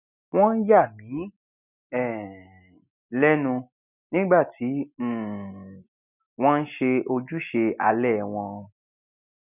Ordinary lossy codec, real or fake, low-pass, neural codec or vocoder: none; real; 3.6 kHz; none